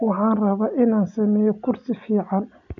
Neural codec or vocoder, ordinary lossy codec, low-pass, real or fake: none; none; 7.2 kHz; real